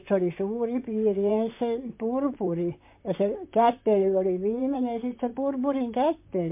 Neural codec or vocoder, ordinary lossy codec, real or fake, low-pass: vocoder, 44.1 kHz, 128 mel bands, Pupu-Vocoder; MP3, 32 kbps; fake; 3.6 kHz